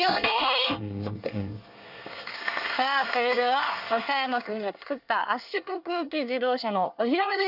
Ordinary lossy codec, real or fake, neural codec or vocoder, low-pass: none; fake; codec, 24 kHz, 1 kbps, SNAC; 5.4 kHz